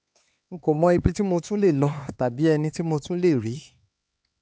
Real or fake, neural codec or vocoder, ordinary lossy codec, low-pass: fake; codec, 16 kHz, 4 kbps, X-Codec, HuBERT features, trained on LibriSpeech; none; none